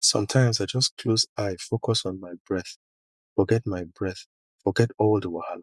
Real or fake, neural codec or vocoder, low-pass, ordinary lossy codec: fake; vocoder, 24 kHz, 100 mel bands, Vocos; none; none